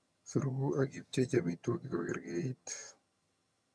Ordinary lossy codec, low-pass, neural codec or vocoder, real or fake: none; none; vocoder, 22.05 kHz, 80 mel bands, HiFi-GAN; fake